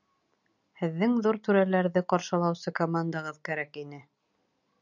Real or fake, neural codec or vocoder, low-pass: real; none; 7.2 kHz